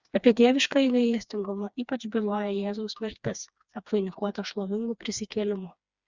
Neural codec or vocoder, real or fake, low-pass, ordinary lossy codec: codec, 16 kHz, 2 kbps, FreqCodec, smaller model; fake; 7.2 kHz; Opus, 64 kbps